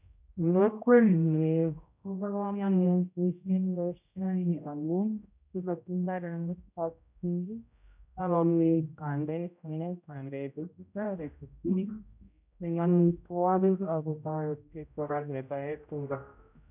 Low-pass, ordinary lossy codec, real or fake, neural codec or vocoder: 3.6 kHz; none; fake; codec, 16 kHz, 0.5 kbps, X-Codec, HuBERT features, trained on general audio